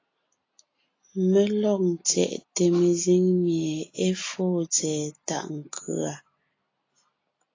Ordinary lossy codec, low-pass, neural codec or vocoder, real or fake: AAC, 32 kbps; 7.2 kHz; none; real